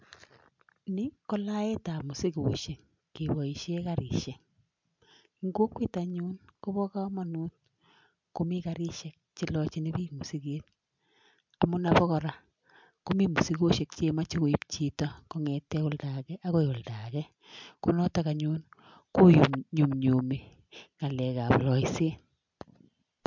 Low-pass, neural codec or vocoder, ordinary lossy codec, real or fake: 7.2 kHz; none; MP3, 64 kbps; real